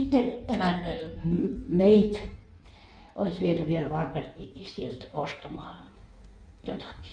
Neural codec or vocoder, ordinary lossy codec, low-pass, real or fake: codec, 16 kHz in and 24 kHz out, 1.1 kbps, FireRedTTS-2 codec; none; 9.9 kHz; fake